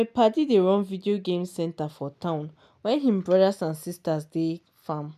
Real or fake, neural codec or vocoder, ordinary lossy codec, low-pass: real; none; none; 14.4 kHz